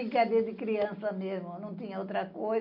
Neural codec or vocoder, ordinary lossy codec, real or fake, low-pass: none; none; real; 5.4 kHz